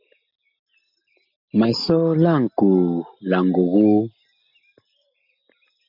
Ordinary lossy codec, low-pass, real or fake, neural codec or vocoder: MP3, 48 kbps; 5.4 kHz; real; none